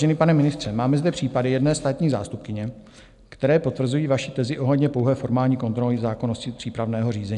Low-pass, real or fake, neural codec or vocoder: 10.8 kHz; real; none